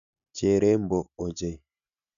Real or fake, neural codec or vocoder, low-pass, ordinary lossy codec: real; none; 7.2 kHz; none